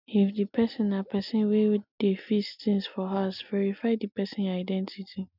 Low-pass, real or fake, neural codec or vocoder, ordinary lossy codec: 5.4 kHz; real; none; none